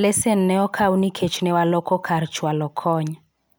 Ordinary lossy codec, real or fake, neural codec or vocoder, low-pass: none; real; none; none